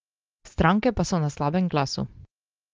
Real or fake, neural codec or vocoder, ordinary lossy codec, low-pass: real; none; Opus, 16 kbps; 7.2 kHz